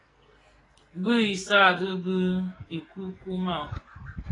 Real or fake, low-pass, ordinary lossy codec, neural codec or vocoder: fake; 10.8 kHz; AAC, 32 kbps; codec, 44.1 kHz, 2.6 kbps, SNAC